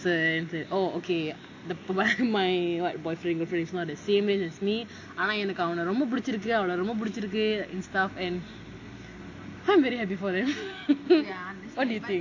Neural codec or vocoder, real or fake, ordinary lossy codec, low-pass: none; real; AAC, 48 kbps; 7.2 kHz